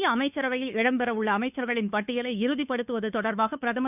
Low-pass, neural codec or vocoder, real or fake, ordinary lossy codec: 3.6 kHz; codec, 16 kHz, 2 kbps, FunCodec, trained on Chinese and English, 25 frames a second; fake; none